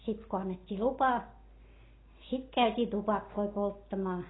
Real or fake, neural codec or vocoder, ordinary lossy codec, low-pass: real; none; AAC, 16 kbps; 7.2 kHz